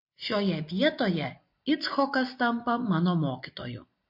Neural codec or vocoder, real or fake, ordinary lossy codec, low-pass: none; real; MP3, 32 kbps; 5.4 kHz